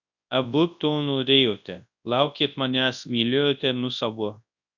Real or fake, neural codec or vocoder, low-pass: fake; codec, 24 kHz, 0.9 kbps, WavTokenizer, large speech release; 7.2 kHz